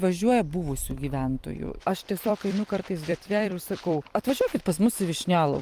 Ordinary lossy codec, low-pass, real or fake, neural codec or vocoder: Opus, 32 kbps; 14.4 kHz; fake; vocoder, 44.1 kHz, 128 mel bands every 256 samples, BigVGAN v2